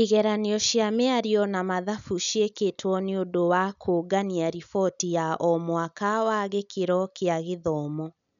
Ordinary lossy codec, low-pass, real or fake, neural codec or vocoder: none; 7.2 kHz; real; none